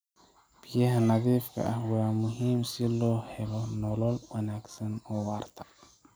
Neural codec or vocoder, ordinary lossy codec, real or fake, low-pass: none; none; real; none